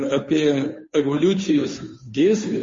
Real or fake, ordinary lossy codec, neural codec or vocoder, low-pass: fake; MP3, 32 kbps; codec, 16 kHz, 2 kbps, FunCodec, trained on Chinese and English, 25 frames a second; 7.2 kHz